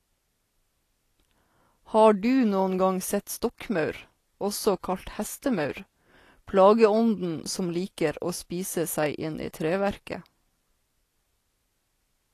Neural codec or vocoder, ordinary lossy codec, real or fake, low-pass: none; AAC, 48 kbps; real; 14.4 kHz